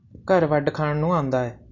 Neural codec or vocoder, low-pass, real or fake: none; 7.2 kHz; real